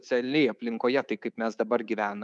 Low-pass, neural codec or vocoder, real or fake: 10.8 kHz; codec, 24 kHz, 3.1 kbps, DualCodec; fake